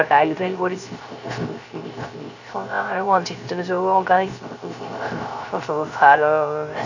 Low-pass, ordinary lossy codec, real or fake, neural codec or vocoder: 7.2 kHz; none; fake; codec, 16 kHz, 0.3 kbps, FocalCodec